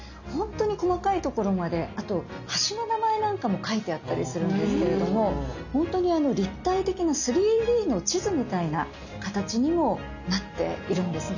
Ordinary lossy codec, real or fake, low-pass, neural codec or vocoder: none; real; 7.2 kHz; none